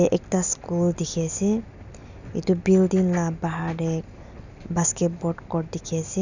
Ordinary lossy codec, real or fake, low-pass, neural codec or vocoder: none; real; 7.2 kHz; none